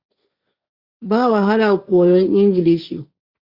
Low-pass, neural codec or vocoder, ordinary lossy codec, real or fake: 5.4 kHz; codec, 16 kHz, 1.1 kbps, Voila-Tokenizer; Opus, 64 kbps; fake